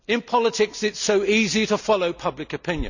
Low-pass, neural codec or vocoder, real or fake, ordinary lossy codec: 7.2 kHz; none; real; none